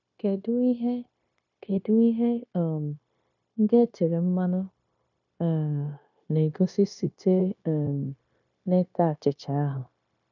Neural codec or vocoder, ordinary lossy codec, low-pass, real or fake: codec, 16 kHz, 0.9 kbps, LongCat-Audio-Codec; none; 7.2 kHz; fake